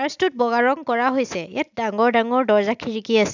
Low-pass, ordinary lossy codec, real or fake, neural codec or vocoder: 7.2 kHz; none; real; none